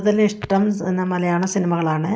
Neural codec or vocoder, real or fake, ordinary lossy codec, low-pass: none; real; none; none